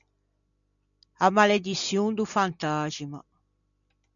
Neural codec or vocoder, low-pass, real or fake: none; 7.2 kHz; real